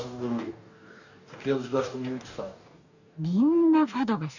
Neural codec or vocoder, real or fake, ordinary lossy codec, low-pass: codec, 44.1 kHz, 2.6 kbps, DAC; fake; none; 7.2 kHz